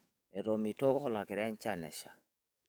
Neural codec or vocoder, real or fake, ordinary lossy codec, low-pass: codec, 44.1 kHz, 7.8 kbps, DAC; fake; none; none